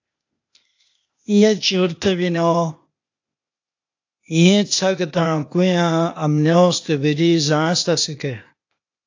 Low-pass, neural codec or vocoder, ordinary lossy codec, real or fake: 7.2 kHz; codec, 16 kHz, 0.8 kbps, ZipCodec; AAC, 48 kbps; fake